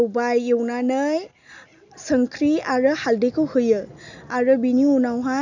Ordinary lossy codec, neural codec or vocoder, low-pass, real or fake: none; none; 7.2 kHz; real